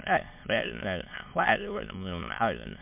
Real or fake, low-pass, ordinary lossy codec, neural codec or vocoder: fake; 3.6 kHz; MP3, 32 kbps; autoencoder, 22.05 kHz, a latent of 192 numbers a frame, VITS, trained on many speakers